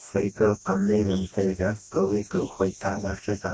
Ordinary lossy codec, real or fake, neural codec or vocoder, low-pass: none; fake; codec, 16 kHz, 1 kbps, FreqCodec, smaller model; none